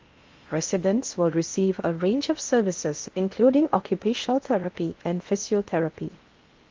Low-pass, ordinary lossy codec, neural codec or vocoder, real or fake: 7.2 kHz; Opus, 32 kbps; codec, 16 kHz in and 24 kHz out, 0.8 kbps, FocalCodec, streaming, 65536 codes; fake